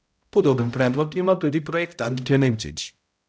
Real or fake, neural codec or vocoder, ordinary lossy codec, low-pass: fake; codec, 16 kHz, 0.5 kbps, X-Codec, HuBERT features, trained on balanced general audio; none; none